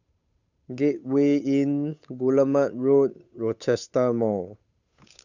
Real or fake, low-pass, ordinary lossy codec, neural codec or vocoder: fake; 7.2 kHz; none; codec, 16 kHz, 8 kbps, FunCodec, trained on Chinese and English, 25 frames a second